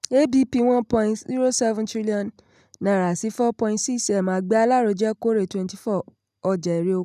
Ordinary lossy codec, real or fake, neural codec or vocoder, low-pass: none; real; none; 14.4 kHz